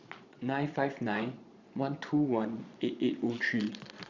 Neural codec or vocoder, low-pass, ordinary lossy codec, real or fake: vocoder, 22.05 kHz, 80 mel bands, Vocos; 7.2 kHz; Opus, 64 kbps; fake